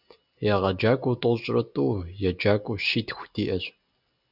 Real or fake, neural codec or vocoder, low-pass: real; none; 5.4 kHz